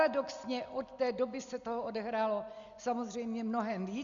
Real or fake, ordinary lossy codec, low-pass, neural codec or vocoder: real; Opus, 64 kbps; 7.2 kHz; none